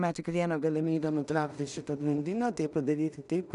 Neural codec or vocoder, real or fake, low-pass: codec, 16 kHz in and 24 kHz out, 0.4 kbps, LongCat-Audio-Codec, two codebook decoder; fake; 10.8 kHz